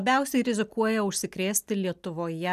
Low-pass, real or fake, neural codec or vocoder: 14.4 kHz; real; none